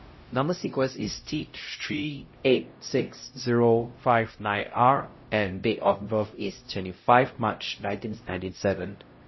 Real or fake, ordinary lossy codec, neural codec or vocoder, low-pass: fake; MP3, 24 kbps; codec, 16 kHz, 0.5 kbps, X-Codec, HuBERT features, trained on LibriSpeech; 7.2 kHz